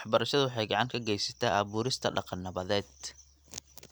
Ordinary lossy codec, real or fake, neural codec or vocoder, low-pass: none; real; none; none